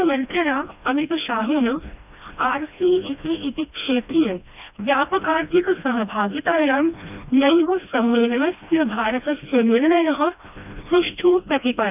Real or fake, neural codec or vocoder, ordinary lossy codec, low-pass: fake; codec, 16 kHz, 1 kbps, FreqCodec, smaller model; none; 3.6 kHz